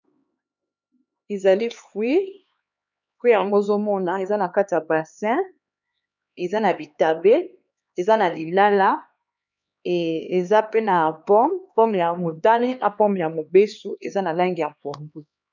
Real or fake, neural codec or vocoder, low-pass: fake; codec, 16 kHz, 2 kbps, X-Codec, HuBERT features, trained on LibriSpeech; 7.2 kHz